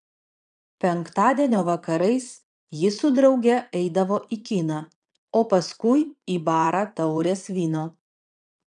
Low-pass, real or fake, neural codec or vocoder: 9.9 kHz; fake; vocoder, 22.05 kHz, 80 mel bands, Vocos